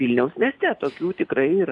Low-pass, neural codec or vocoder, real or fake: 10.8 kHz; vocoder, 44.1 kHz, 128 mel bands every 256 samples, BigVGAN v2; fake